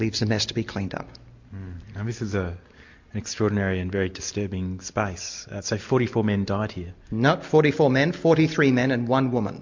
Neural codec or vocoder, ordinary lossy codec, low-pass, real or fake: none; MP3, 48 kbps; 7.2 kHz; real